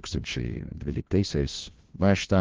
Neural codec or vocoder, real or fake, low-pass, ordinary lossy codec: codec, 16 kHz, 1 kbps, FunCodec, trained on LibriTTS, 50 frames a second; fake; 7.2 kHz; Opus, 16 kbps